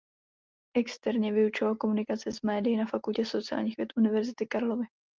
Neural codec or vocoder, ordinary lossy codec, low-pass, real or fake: none; Opus, 32 kbps; 7.2 kHz; real